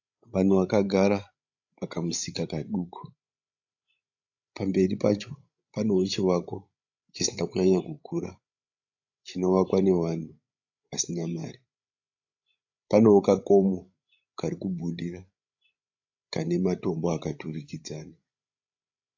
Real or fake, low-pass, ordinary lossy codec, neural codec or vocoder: fake; 7.2 kHz; AAC, 48 kbps; codec, 16 kHz, 16 kbps, FreqCodec, larger model